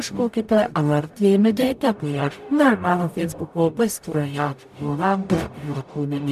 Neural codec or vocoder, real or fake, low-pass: codec, 44.1 kHz, 0.9 kbps, DAC; fake; 14.4 kHz